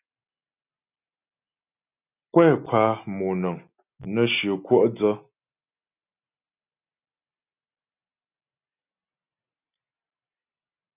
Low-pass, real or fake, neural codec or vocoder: 3.6 kHz; real; none